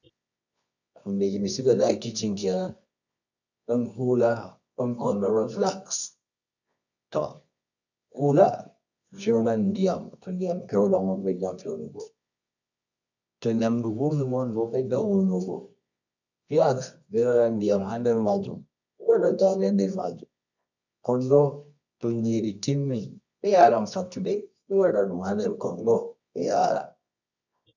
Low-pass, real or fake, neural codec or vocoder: 7.2 kHz; fake; codec, 24 kHz, 0.9 kbps, WavTokenizer, medium music audio release